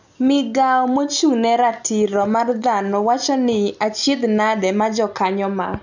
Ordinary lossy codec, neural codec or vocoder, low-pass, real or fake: none; none; 7.2 kHz; real